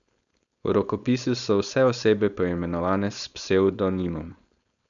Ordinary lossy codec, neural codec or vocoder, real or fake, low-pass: none; codec, 16 kHz, 4.8 kbps, FACodec; fake; 7.2 kHz